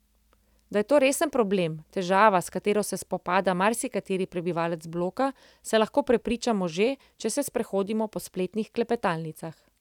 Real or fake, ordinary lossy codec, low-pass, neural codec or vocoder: real; none; 19.8 kHz; none